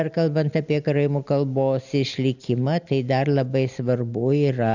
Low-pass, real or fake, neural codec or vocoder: 7.2 kHz; real; none